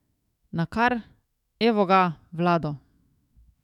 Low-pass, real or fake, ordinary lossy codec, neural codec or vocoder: 19.8 kHz; fake; none; autoencoder, 48 kHz, 128 numbers a frame, DAC-VAE, trained on Japanese speech